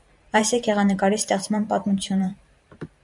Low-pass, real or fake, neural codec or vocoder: 10.8 kHz; fake; vocoder, 44.1 kHz, 128 mel bands every 512 samples, BigVGAN v2